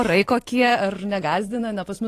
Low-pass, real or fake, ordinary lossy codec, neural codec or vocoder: 14.4 kHz; fake; AAC, 48 kbps; vocoder, 44.1 kHz, 128 mel bands every 512 samples, BigVGAN v2